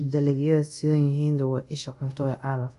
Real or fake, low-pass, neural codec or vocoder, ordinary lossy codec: fake; 10.8 kHz; codec, 24 kHz, 0.5 kbps, DualCodec; none